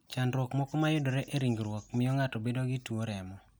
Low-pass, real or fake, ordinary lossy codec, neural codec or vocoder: none; real; none; none